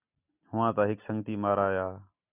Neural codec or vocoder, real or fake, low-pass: none; real; 3.6 kHz